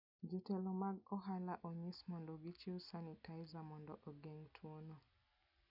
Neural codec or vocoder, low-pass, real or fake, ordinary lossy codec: none; 5.4 kHz; real; none